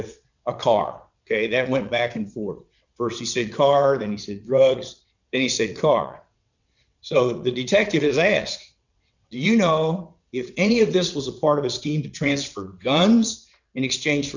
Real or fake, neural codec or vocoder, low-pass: fake; vocoder, 22.05 kHz, 80 mel bands, WaveNeXt; 7.2 kHz